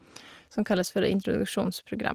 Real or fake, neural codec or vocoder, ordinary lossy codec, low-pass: real; none; Opus, 24 kbps; 14.4 kHz